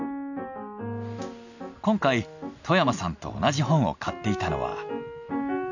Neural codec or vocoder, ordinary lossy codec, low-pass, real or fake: none; none; 7.2 kHz; real